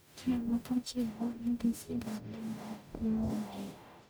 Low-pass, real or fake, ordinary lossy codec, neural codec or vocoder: none; fake; none; codec, 44.1 kHz, 0.9 kbps, DAC